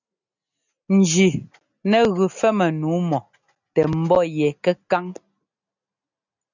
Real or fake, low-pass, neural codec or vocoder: real; 7.2 kHz; none